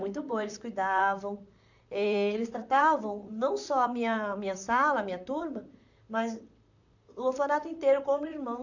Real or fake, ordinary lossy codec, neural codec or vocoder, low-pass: fake; none; vocoder, 44.1 kHz, 128 mel bands, Pupu-Vocoder; 7.2 kHz